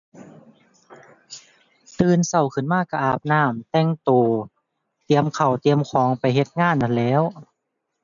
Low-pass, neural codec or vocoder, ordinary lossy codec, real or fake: 7.2 kHz; none; none; real